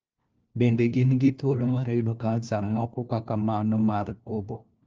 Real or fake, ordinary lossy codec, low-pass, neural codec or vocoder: fake; Opus, 24 kbps; 7.2 kHz; codec, 16 kHz, 1 kbps, FunCodec, trained on LibriTTS, 50 frames a second